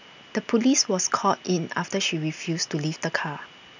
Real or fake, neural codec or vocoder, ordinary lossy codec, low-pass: real; none; none; 7.2 kHz